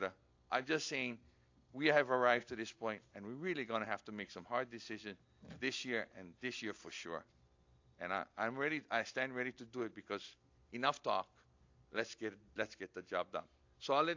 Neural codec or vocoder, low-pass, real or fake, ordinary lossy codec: none; 7.2 kHz; real; AAC, 48 kbps